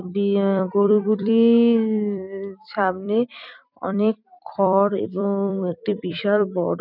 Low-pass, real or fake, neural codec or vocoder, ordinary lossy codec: 5.4 kHz; fake; vocoder, 44.1 kHz, 128 mel bands every 256 samples, BigVGAN v2; none